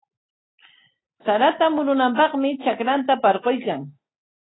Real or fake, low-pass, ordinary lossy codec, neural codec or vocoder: real; 7.2 kHz; AAC, 16 kbps; none